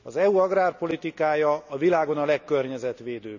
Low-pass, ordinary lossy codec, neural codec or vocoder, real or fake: 7.2 kHz; none; none; real